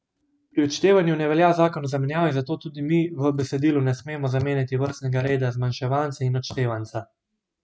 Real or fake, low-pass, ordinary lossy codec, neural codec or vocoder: real; none; none; none